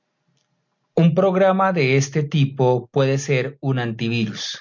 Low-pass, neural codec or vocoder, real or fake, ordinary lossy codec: 7.2 kHz; none; real; MP3, 48 kbps